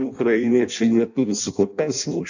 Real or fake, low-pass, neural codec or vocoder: fake; 7.2 kHz; codec, 16 kHz in and 24 kHz out, 0.6 kbps, FireRedTTS-2 codec